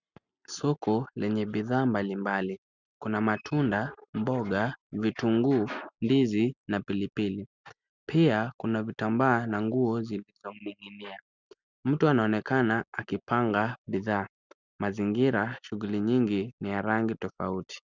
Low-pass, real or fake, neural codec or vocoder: 7.2 kHz; real; none